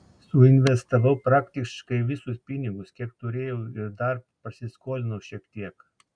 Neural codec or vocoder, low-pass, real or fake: none; 9.9 kHz; real